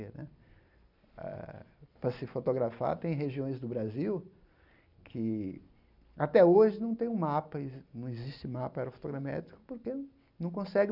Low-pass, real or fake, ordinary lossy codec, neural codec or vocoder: 5.4 kHz; real; none; none